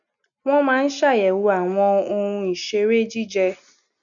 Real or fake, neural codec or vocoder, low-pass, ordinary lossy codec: real; none; 7.2 kHz; none